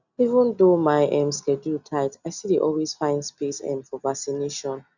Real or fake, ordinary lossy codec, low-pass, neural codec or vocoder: real; none; 7.2 kHz; none